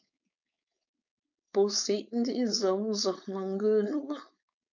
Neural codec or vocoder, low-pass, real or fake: codec, 16 kHz, 4.8 kbps, FACodec; 7.2 kHz; fake